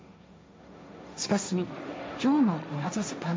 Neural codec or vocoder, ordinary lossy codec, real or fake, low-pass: codec, 16 kHz, 1.1 kbps, Voila-Tokenizer; none; fake; none